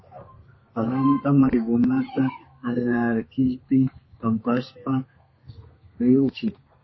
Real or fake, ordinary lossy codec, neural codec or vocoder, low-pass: fake; MP3, 24 kbps; codec, 32 kHz, 1.9 kbps, SNAC; 7.2 kHz